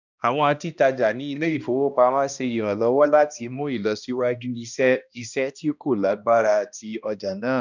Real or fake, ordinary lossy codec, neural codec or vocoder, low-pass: fake; none; codec, 16 kHz, 1 kbps, X-Codec, HuBERT features, trained on balanced general audio; 7.2 kHz